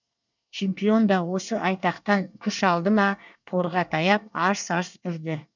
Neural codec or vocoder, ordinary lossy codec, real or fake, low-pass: codec, 24 kHz, 1 kbps, SNAC; none; fake; 7.2 kHz